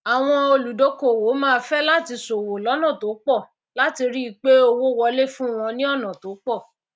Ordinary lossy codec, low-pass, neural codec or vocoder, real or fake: none; none; none; real